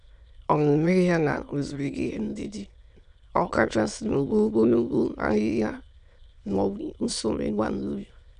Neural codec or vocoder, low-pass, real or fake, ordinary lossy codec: autoencoder, 22.05 kHz, a latent of 192 numbers a frame, VITS, trained on many speakers; 9.9 kHz; fake; none